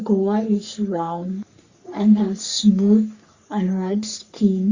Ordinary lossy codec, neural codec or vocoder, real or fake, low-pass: Opus, 64 kbps; codec, 44.1 kHz, 3.4 kbps, Pupu-Codec; fake; 7.2 kHz